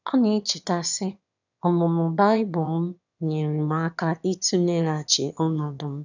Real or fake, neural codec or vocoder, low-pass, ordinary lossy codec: fake; autoencoder, 22.05 kHz, a latent of 192 numbers a frame, VITS, trained on one speaker; 7.2 kHz; none